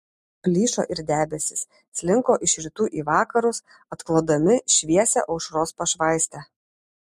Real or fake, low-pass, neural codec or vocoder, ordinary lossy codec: real; 14.4 kHz; none; MP3, 64 kbps